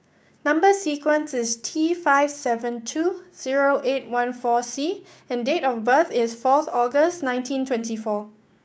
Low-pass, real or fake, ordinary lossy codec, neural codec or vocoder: none; fake; none; codec, 16 kHz, 6 kbps, DAC